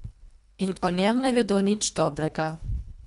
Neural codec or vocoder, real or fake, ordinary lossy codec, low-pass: codec, 24 kHz, 1.5 kbps, HILCodec; fake; none; 10.8 kHz